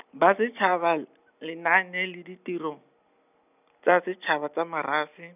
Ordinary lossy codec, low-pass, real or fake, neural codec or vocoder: none; 3.6 kHz; real; none